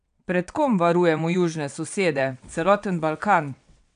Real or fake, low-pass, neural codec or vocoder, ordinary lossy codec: fake; 9.9 kHz; vocoder, 22.05 kHz, 80 mel bands, WaveNeXt; none